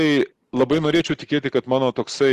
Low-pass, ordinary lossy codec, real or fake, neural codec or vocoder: 14.4 kHz; Opus, 16 kbps; real; none